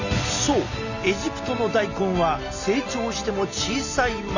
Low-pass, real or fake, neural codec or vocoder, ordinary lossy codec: 7.2 kHz; real; none; none